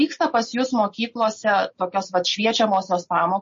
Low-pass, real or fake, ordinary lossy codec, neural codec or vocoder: 7.2 kHz; real; MP3, 32 kbps; none